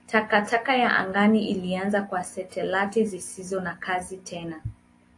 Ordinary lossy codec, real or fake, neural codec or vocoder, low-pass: AAC, 48 kbps; real; none; 10.8 kHz